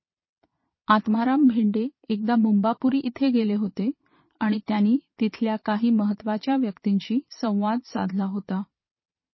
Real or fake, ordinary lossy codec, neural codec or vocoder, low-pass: fake; MP3, 24 kbps; vocoder, 22.05 kHz, 80 mel bands, Vocos; 7.2 kHz